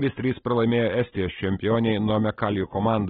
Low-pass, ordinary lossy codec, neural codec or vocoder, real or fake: 19.8 kHz; AAC, 16 kbps; none; real